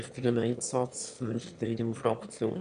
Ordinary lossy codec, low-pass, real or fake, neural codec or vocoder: none; 9.9 kHz; fake; autoencoder, 22.05 kHz, a latent of 192 numbers a frame, VITS, trained on one speaker